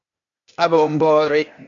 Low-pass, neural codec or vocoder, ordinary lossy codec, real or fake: 7.2 kHz; codec, 16 kHz, 0.8 kbps, ZipCodec; AAC, 48 kbps; fake